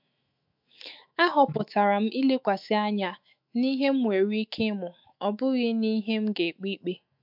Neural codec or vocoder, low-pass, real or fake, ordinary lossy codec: autoencoder, 48 kHz, 128 numbers a frame, DAC-VAE, trained on Japanese speech; 5.4 kHz; fake; MP3, 48 kbps